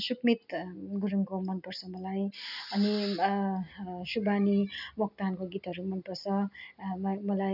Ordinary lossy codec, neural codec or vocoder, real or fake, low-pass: none; none; real; 5.4 kHz